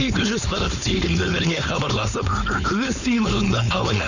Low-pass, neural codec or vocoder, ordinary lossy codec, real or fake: 7.2 kHz; codec, 16 kHz, 4.8 kbps, FACodec; none; fake